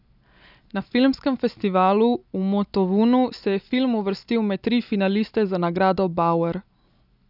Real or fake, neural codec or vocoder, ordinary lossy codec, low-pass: real; none; none; 5.4 kHz